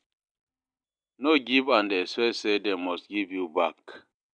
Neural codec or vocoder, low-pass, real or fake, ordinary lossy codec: none; 9.9 kHz; real; none